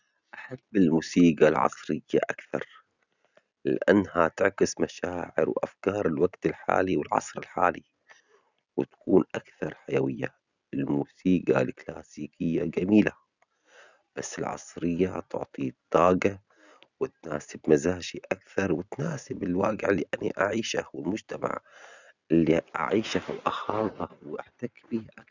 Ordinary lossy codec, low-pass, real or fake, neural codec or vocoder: none; 7.2 kHz; real; none